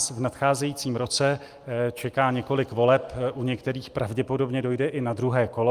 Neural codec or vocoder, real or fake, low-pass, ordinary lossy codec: none; real; 14.4 kHz; Opus, 32 kbps